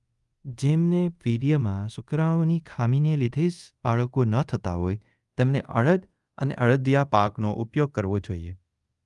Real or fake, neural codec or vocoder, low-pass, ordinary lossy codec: fake; codec, 24 kHz, 0.5 kbps, DualCodec; 10.8 kHz; Opus, 32 kbps